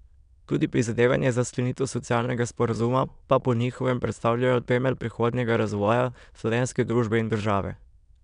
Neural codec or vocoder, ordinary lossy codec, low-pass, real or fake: autoencoder, 22.05 kHz, a latent of 192 numbers a frame, VITS, trained on many speakers; none; 9.9 kHz; fake